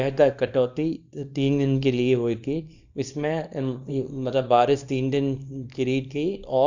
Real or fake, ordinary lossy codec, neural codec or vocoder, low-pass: fake; none; codec, 24 kHz, 0.9 kbps, WavTokenizer, small release; 7.2 kHz